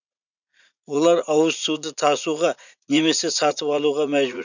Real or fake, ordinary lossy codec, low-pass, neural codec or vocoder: fake; none; 7.2 kHz; vocoder, 44.1 kHz, 80 mel bands, Vocos